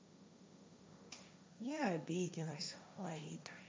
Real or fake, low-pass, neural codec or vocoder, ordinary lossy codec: fake; none; codec, 16 kHz, 1.1 kbps, Voila-Tokenizer; none